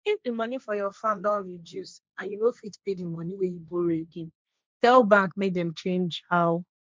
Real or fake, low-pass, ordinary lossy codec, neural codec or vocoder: fake; none; none; codec, 16 kHz, 1.1 kbps, Voila-Tokenizer